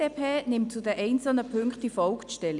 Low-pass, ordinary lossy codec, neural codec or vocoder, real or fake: 10.8 kHz; none; none; real